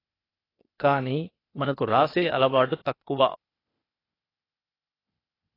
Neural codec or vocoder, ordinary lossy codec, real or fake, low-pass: codec, 16 kHz, 0.8 kbps, ZipCodec; AAC, 24 kbps; fake; 5.4 kHz